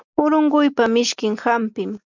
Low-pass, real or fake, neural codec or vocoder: 7.2 kHz; real; none